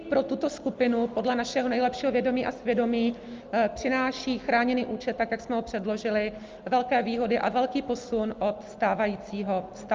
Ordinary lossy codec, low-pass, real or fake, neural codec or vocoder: Opus, 24 kbps; 7.2 kHz; real; none